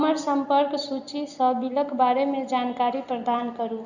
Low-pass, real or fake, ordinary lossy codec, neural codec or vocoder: 7.2 kHz; real; Opus, 64 kbps; none